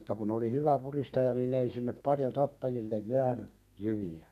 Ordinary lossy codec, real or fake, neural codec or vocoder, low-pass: none; fake; codec, 32 kHz, 1.9 kbps, SNAC; 14.4 kHz